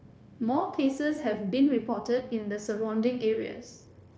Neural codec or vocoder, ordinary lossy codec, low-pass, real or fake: codec, 16 kHz, 0.9 kbps, LongCat-Audio-Codec; none; none; fake